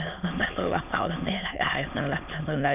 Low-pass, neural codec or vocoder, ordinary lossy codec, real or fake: 3.6 kHz; autoencoder, 22.05 kHz, a latent of 192 numbers a frame, VITS, trained on many speakers; none; fake